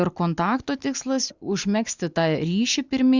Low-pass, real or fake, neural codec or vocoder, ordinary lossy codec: 7.2 kHz; real; none; Opus, 64 kbps